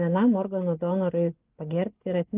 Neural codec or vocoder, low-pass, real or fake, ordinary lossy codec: none; 3.6 kHz; real; Opus, 24 kbps